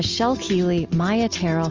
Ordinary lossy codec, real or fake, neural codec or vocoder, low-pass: Opus, 32 kbps; real; none; 7.2 kHz